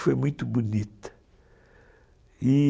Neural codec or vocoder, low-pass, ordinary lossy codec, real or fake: none; none; none; real